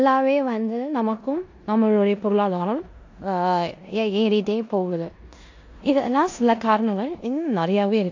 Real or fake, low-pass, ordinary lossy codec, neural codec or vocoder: fake; 7.2 kHz; AAC, 48 kbps; codec, 16 kHz in and 24 kHz out, 0.9 kbps, LongCat-Audio-Codec, four codebook decoder